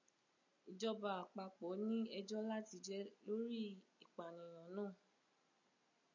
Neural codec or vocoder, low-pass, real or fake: none; 7.2 kHz; real